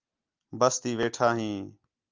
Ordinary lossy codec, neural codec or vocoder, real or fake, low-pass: Opus, 24 kbps; none; real; 7.2 kHz